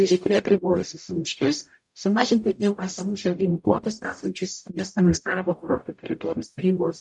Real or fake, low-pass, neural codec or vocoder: fake; 10.8 kHz; codec, 44.1 kHz, 0.9 kbps, DAC